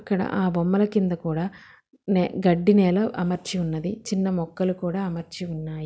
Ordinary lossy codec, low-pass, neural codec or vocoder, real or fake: none; none; none; real